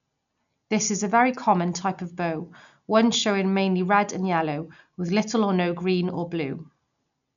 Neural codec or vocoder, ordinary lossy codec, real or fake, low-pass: none; none; real; 7.2 kHz